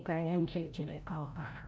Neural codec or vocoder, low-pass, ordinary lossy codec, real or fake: codec, 16 kHz, 0.5 kbps, FreqCodec, larger model; none; none; fake